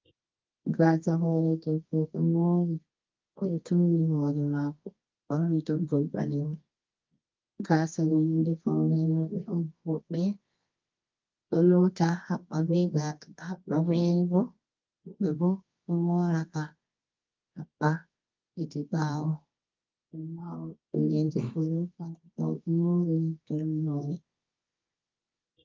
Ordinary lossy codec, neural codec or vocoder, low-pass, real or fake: Opus, 32 kbps; codec, 24 kHz, 0.9 kbps, WavTokenizer, medium music audio release; 7.2 kHz; fake